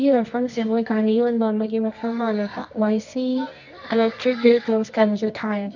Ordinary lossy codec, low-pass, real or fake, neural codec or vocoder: none; 7.2 kHz; fake; codec, 24 kHz, 0.9 kbps, WavTokenizer, medium music audio release